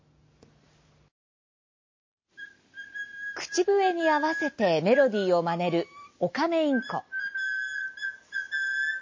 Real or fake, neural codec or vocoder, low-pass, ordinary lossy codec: real; none; 7.2 kHz; MP3, 32 kbps